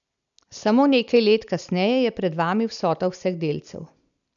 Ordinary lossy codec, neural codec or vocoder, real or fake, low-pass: none; none; real; 7.2 kHz